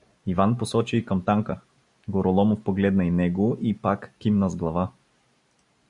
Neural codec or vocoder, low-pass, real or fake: none; 10.8 kHz; real